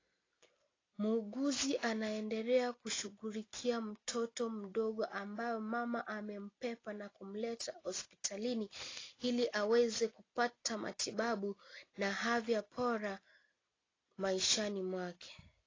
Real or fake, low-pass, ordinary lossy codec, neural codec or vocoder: real; 7.2 kHz; AAC, 32 kbps; none